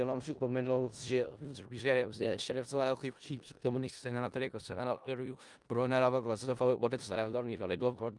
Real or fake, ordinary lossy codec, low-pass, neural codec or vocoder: fake; Opus, 32 kbps; 10.8 kHz; codec, 16 kHz in and 24 kHz out, 0.4 kbps, LongCat-Audio-Codec, four codebook decoder